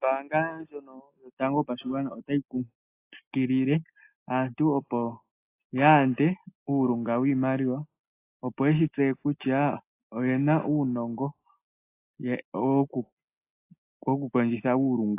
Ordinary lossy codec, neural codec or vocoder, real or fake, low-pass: AAC, 24 kbps; none; real; 3.6 kHz